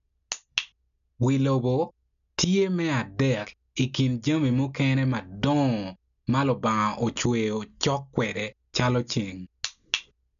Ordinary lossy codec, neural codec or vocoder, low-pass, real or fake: none; none; 7.2 kHz; real